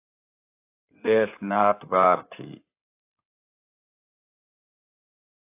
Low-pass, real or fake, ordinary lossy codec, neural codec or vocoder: 3.6 kHz; fake; AAC, 32 kbps; codec, 16 kHz in and 24 kHz out, 2.2 kbps, FireRedTTS-2 codec